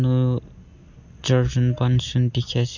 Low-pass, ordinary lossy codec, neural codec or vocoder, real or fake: 7.2 kHz; none; none; real